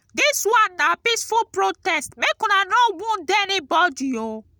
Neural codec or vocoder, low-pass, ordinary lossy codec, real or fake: none; none; none; real